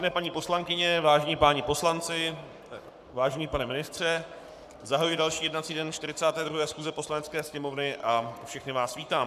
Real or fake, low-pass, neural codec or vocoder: fake; 14.4 kHz; codec, 44.1 kHz, 7.8 kbps, Pupu-Codec